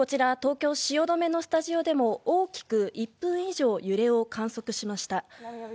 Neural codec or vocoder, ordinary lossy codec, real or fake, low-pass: none; none; real; none